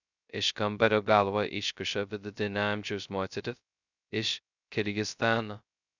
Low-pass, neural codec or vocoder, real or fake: 7.2 kHz; codec, 16 kHz, 0.2 kbps, FocalCodec; fake